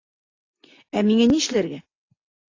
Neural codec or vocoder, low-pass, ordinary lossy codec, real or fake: none; 7.2 kHz; AAC, 32 kbps; real